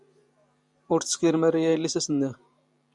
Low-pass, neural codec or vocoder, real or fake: 10.8 kHz; vocoder, 44.1 kHz, 128 mel bands every 512 samples, BigVGAN v2; fake